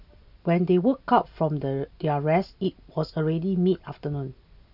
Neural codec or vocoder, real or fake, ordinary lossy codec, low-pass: none; real; none; 5.4 kHz